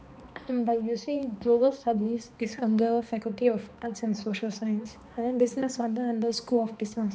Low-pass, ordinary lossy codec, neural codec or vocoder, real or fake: none; none; codec, 16 kHz, 2 kbps, X-Codec, HuBERT features, trained on balanced general audio; fake